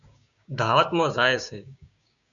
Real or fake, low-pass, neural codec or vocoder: fake; 7.2 kHz; codec, 16 kHz, 6 kbps, DAC